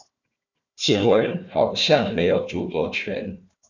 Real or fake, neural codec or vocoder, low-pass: fake; codec, 16 kHz, 1 kbps, FunCodec, trained on Chinese and English, 50 frames a second; 7.2 kHz